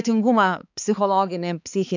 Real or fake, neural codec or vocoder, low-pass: fake; codec, 16 kHz, 4 kbps, X-Codec, HuBERT features, trained on balanced general audio; 7.2 kHz